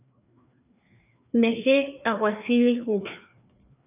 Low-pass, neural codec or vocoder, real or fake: 3.6 kHz; codec, 16 kHz, 2 kbps, FreqCodec, larger model; fake